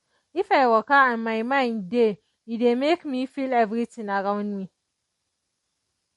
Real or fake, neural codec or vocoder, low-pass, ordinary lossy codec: real; none; 19.8 kHz; MP3, 48 kbps